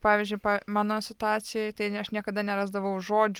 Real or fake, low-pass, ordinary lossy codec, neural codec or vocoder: real; 19.8 kHz; Opus, 32 kbps; none